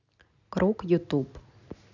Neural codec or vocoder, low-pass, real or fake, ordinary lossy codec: none; 7.2 kHz; real; none